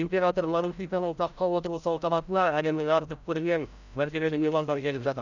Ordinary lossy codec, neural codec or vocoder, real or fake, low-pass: none; codec, 16 kHz, 0.5 kbps, FreqCodec, larger model; fake; 7.2 kHz